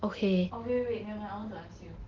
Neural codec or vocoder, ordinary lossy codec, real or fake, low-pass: none; Opus, 16 kbps; real; 7.2 kHz